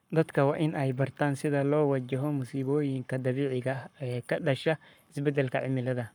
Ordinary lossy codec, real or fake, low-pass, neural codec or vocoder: none; fake; none; codec, 44.1 kHz, 7.8 kbps, Pupu-Codec